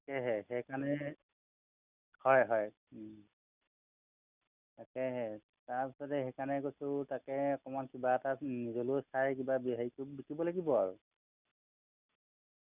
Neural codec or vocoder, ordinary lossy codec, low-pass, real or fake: none; none; 3.6 kHz; real